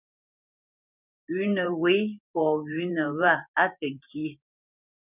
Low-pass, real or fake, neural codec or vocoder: 3.6 kHz; fake; vocoder, 44.1 kHz, 128 mel bands every 512 samples, BigVGAN v2